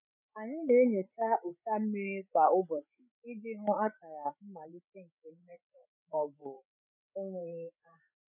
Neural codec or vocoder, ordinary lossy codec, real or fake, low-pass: codec, 16 kHz, 8 kbps, FreqCodec, larger model; none; fake; 3.6 kHz